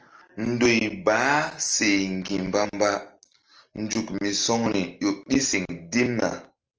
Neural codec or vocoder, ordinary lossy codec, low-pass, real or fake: none; Opus, 24 kbps; 7.2 kHz; real